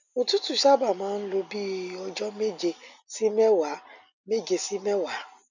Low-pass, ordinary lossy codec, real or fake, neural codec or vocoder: 7.2 kHz; none; real; none